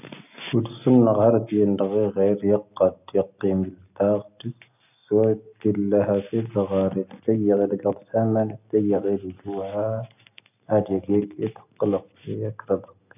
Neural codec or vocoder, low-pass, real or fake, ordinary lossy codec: none; 3.6 kHz; real; none